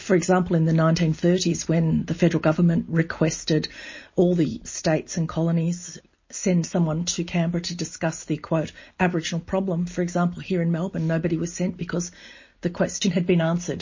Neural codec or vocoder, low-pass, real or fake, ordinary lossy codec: none; 7.2 kHz; real; MP3, 32 kbps